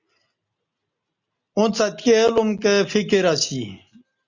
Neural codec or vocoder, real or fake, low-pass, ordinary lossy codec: none; real; 7.2 kHz; Opus, 64 kbps